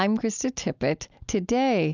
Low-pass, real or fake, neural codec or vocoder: 7.2 kHz; real; none